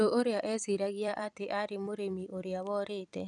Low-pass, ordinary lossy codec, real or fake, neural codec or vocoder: 10.8 kHz; none; fake; vocoder, 44.1 kHz, 128 mel bands every 256 samples, BigVGAN v2